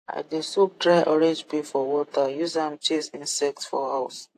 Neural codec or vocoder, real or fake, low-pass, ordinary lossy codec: vocoder, 48 kHz, 128 mel bands, Vocos; fake; 14.4 kHz; AAC, 64 kbps